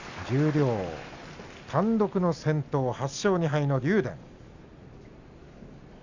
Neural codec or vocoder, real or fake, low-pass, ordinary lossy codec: none; real; 7.2 kHz; none